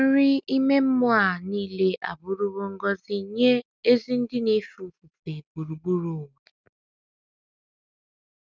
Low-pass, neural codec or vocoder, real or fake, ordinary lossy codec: none; none; real; none